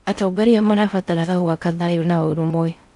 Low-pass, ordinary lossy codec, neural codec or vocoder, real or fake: 10.8 kHz; none; codec, 16 kHz in and 24 kHz out, 0.6 kbps, FocalCodec, streaming, 4096 codes; fake